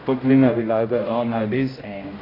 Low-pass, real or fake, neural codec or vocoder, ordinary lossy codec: 5.4 kHz; fake; codec, 16 kHz, 0.5 kbps, X-Codec, HuBERT features, trained on general audio; AAC, 24 kbps